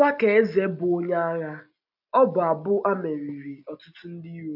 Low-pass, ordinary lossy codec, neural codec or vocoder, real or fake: 5.4 kHz; AAC, 48 kbps; none; real